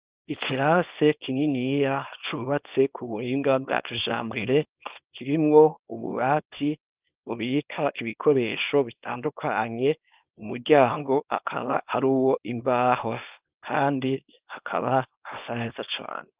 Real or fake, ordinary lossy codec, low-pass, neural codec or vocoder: fake; Opus, 32 kbps; 3.6 kHz; codec, 24 kHz, 0.9 kbps, WavTokenizer, small release